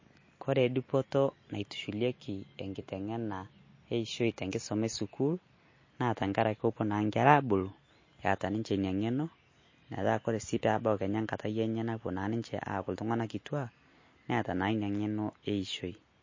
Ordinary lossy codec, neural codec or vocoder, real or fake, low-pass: MP3, 32 kbps; none; real; 7.2 kHz